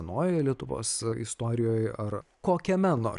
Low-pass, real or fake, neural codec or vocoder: 10.8 kHz; real; none